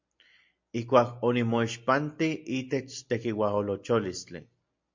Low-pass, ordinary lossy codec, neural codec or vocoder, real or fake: 7.2 kHz; MP3, 48 kbps; none; real